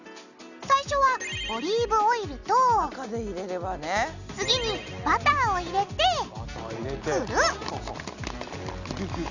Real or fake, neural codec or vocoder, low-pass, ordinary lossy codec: real; none; 7.2 kHz; none